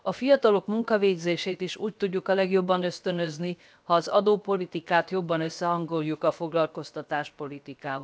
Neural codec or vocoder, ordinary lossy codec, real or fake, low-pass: codec, 16 kHz, 0.7 kbps, FocalCodec; none; fake; none